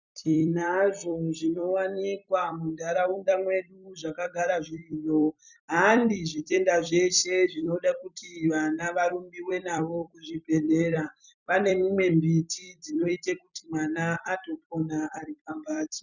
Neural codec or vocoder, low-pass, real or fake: vocoder, 44.1 kHz, 128 mel bands every 256 samples, BigVGAN v2; 7.2 kHz; fake